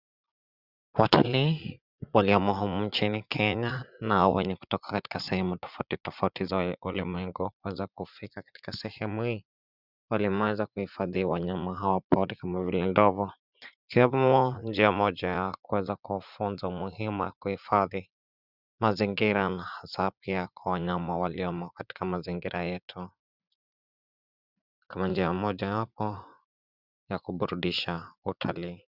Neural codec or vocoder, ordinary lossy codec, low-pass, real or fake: vocoder, 44.1 kHz, 80 mel bands, Vocos; Opus, 64 kbps; 5.4 kHz; fake